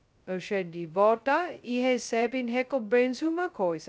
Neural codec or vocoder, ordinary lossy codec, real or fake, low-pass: codec, 16 kHz, 0.2 kbps, FocalCodec; none; fake; none